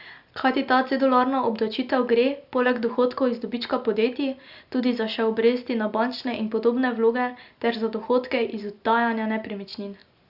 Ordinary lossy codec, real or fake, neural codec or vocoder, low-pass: Opus, 64 kbps; real; none; 5.4 kHz